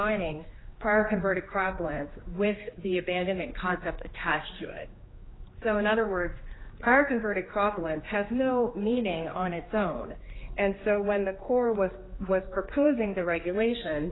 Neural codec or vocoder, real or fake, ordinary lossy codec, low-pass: codec, 16 kHz, 2 kbps, X-Codec, HuBERT features, trained on general audio; fake; AAC, 16 kbps; 7.2 kHz